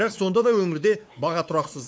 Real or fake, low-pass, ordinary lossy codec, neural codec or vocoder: fake; none; none; codec, 16 kHz, 4 kbps, X-Codec, WavLM features, trained on Multilingual LibriSpeech